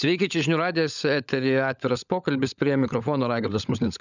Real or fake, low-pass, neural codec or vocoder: fake; 7.2 kHz; codec, 16 kHz, 16 kbps, FunCodec, trained on LibriTTS, 50 frames a second